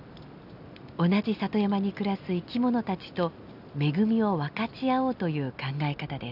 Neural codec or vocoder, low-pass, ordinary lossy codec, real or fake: none; 5.4 kHz; none; real